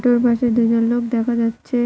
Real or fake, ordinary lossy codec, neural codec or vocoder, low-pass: real; none; none; none